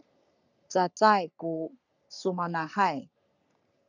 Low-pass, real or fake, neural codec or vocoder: 7.2 kHz; fake; codec, 44.1 kHz, 3.4 kbps, Pupu-Codec